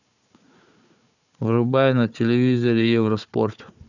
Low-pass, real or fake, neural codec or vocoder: 7.2 kHz; fake; codec, 16 kHz, 4 kbps, FunCodec, trained on Chinese and English, 50 frames a second